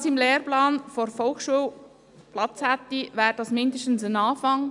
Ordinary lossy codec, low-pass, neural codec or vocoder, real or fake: none; 10.8 kHz; none; real